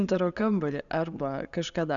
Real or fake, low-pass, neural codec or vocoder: real; 7.2 kHz; none